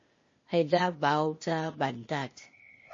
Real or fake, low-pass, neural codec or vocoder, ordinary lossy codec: fake; 7.2 kHz; codec, 16 kHz, 0.8 kbps, ZipCodec; MP3, 32 kbps